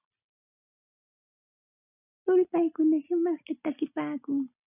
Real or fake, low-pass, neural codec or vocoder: real; 3.6 kHz; none